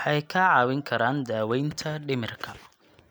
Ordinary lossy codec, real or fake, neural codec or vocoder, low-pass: none; real; none; none